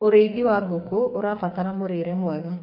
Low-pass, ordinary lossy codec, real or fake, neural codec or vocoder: 5.4 kHz; MP3, 32 kbps; fake; codec, 44.1 kHz, 2.6 kbps, SNAC